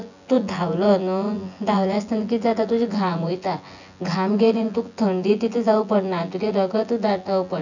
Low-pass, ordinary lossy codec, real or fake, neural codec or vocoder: 7.2 kHz; none; fake; vocoder, 24 kHz, 100 mel bands, Vocos